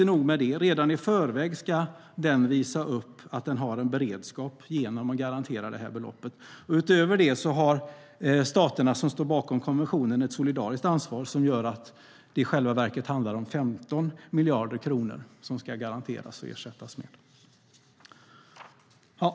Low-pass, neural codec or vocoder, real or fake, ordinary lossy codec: none; none; real; none